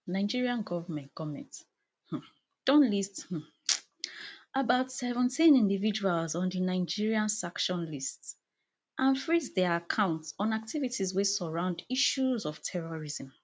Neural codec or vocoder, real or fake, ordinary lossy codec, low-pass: none; real; none; none